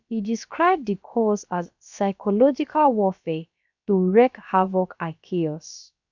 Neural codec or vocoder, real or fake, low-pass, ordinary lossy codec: codec, 16 kHz, about 1 kbps, DyCAST, with the encoder's durations; fake; 7.2 kHz; none